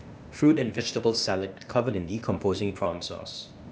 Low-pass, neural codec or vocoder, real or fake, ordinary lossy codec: none; codec, 16 kHz, 0.8 kbps, ZipCodec; fake; none